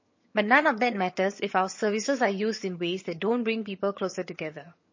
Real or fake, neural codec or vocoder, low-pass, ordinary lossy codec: fake; vocoder, 22.05 kHz, 80 mel bands, HiFi-GAN; 7.2 kHz; MP3, 32 kbps